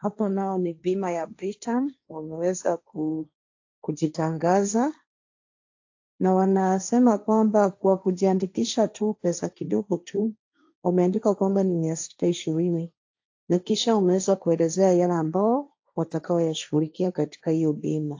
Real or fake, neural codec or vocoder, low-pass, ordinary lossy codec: fake; codec, 16 kHz, 1.1 kbps, Voila-Tokenizer; 7.2 kHz; AAC, 48 kbps